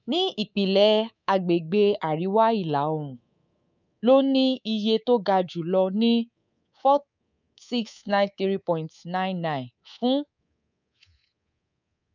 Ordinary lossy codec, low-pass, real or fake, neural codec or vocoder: none; 7.2 kHz; fake; codec, 24 kHz, 3.1 kbps, DualCodec